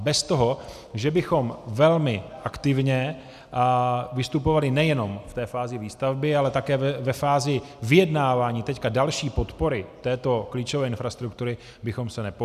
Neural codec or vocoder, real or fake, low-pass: none; real; 14.4 kHz